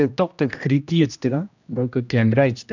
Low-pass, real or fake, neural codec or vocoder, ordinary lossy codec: 7.2 kHz; fake; codec, 16 kHz, 1 kbps, X-Codec, HuBERT features, trained on general audio; none